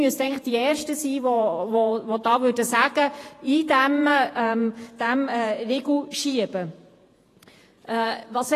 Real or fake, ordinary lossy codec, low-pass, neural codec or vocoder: fake; AAC, 48 kbps; 14.4 kHz; vocoder, 48 kHz, 128 mel bands, Vocos